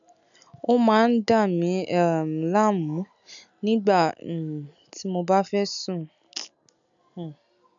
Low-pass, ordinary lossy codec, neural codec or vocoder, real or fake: 7.2 kHz; none; none; real